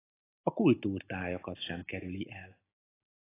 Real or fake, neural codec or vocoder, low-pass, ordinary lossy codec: real; none; 3.6 kHz; AAC, 16 kbps